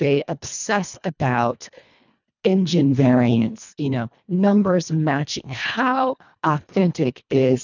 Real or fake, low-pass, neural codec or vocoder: fake; 7.2 kHz; codec, 24 kHz, 1.5 kbps, HILCodec